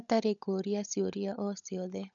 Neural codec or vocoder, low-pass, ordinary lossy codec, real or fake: codec, 16 kHz, 16 kbps, FunCodec, trained on LibriTTS, 50 frames a second; 7.2 kHz; none; fake